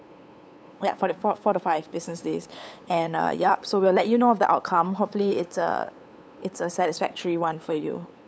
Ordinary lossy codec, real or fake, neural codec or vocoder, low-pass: none; fake; codec, 16 kHz, 8 kbps, FunCodec, trained on LibriTTS, 25 frames a second; none